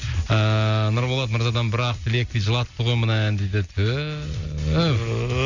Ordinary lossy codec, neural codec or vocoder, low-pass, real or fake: none; none; 7.2 kHz; real